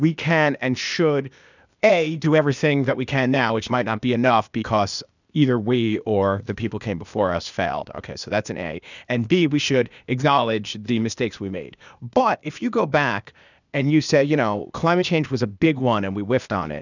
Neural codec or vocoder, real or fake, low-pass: codec, 16 kHz, 0.8 kbps, ZipCodec; fake; 7.2 kHz